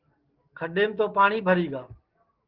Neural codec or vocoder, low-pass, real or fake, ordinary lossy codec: none; 5.4 kHz; real; Opus, 16 kbps